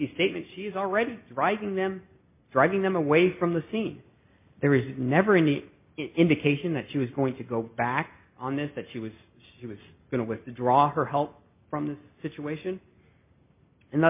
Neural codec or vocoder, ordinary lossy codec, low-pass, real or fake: none; MP3, 32 kbps; 3.6 kHz; real